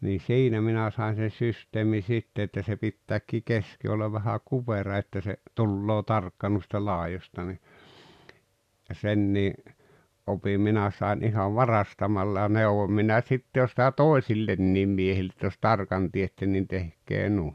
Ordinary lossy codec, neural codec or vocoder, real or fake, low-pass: none; none; real; 14.4 kHz